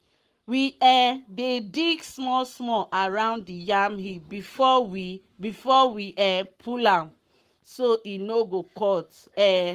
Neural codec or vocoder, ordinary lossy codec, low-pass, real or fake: codec, 44.1 kHz, 7.8 kbps, Pupu-Codec; Opus, 24 kbps; 14.4 kHz; fake